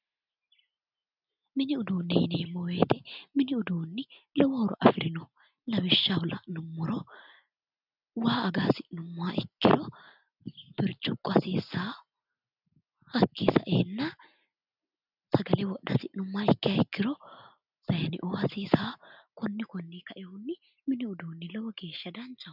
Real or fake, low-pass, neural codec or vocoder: real; 5.4 kHz; none